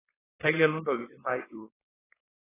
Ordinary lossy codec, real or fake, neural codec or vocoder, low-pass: AAC, 16 kbps; fake; codec, 16 kHz, 4.8 kbps, FACodec; 3.6 kHz